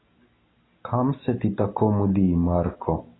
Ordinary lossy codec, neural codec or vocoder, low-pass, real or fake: AAC, 16 kbps; none; 7.2 kHz; real